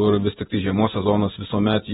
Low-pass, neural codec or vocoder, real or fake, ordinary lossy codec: 19.8 kHz; vocoder, 48 kHz, 128 mel bands, Vocos; fake; AAC, 16 kbps